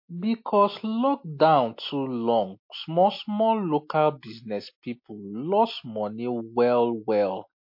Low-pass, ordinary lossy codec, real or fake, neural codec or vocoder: 5.4 kHz; MP3, 32 kbps; real; none